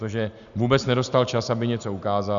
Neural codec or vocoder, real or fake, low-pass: none; real; 7.2 kHz